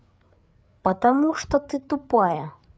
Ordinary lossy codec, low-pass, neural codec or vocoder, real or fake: none; none; codec, 16 kHz, 8 kbps, FreqCodec, larger model; fake